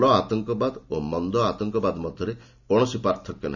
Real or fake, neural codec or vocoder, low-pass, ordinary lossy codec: real; none; 7.2 kHz; none